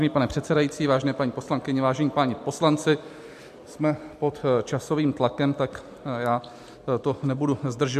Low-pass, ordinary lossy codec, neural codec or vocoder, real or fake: 14.4 kHz; MP3, 64 kbps; none; real